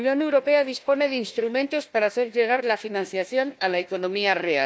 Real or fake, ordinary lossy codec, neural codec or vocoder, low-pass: fake; none; codec, 16 kHz, 1 kbps, FunCodec, trained on Chinese and English, 50 frames a second; none